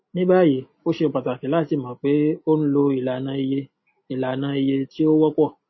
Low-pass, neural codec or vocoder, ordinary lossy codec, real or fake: 7.2 kHz; none; MP3, 24 kbps; real